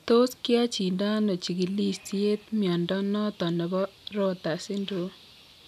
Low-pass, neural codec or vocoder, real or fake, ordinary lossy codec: 14.4 kHz; none; real; none